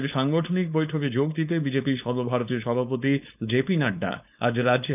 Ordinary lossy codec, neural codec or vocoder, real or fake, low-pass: none; codec, 16 kHz, 4.8 kbps, FACodec; fake; 3.6 kHz